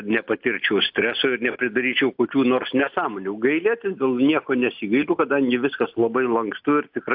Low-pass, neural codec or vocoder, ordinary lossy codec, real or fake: 5.4 kHz; none; AAC, 48 kbps; real